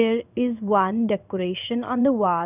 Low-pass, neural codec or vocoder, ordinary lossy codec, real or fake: 3.6 kHz; codec, 16 kHz in and 24 kHz out, 1 kbps, XY-Tokenizer; none; fake